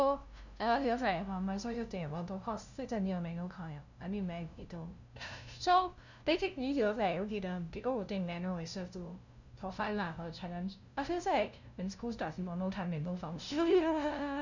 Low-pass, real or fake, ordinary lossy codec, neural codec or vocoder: 7.2 kHz; fake; none; codec, 16 kHz, 0.5 kbps, FunCodec, trained on LibriTTS, 25 frames a second